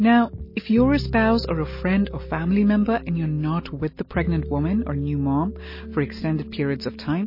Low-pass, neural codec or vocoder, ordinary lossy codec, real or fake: 5.4 kHz; none; MP3, 24 kbps; real